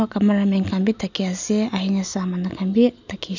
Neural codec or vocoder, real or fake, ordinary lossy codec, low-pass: none; real; none; 7.2 kHz